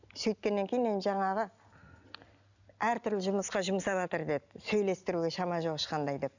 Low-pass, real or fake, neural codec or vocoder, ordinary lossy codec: 7.2 kHz; real; none; none